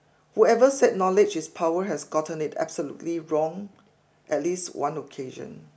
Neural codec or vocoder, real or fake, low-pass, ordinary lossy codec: none; real; none; none